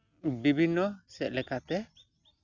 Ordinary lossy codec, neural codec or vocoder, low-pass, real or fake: none; none; 7.2 kHz; real